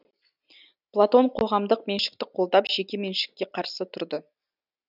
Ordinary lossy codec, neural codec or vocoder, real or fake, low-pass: none; none; real; 5.4 kHz